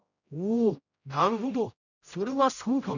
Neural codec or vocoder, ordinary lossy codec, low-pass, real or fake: codec, 16 kHz, 0.5 kbps, X-Codec, HuBERT features, trained on general audio; none; 7.2 kHz; fake